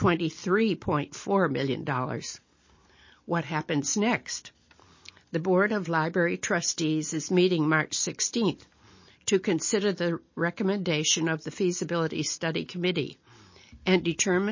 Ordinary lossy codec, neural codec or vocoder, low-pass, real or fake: MP3, 32 kbps; none; 7.2 kHz; real